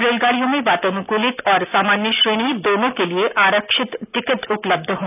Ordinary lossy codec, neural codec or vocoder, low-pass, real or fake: none; none; 3.6 kHz; real